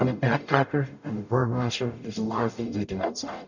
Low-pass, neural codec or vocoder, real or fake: 7.2 kHz; codec, 44.1 kHz, 0.9 kbps, DAC; fake